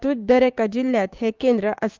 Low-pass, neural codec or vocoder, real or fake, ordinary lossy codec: 7.2 kHz; codec, 16 kHz, 6 kbps, DAC; fake; Opus, 24 kbps